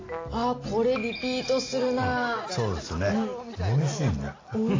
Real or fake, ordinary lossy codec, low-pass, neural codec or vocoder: real; AAC, 32 kbps; 7.2 kHz; none